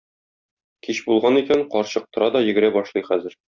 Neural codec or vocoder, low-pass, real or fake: none; 7.2 kHz; real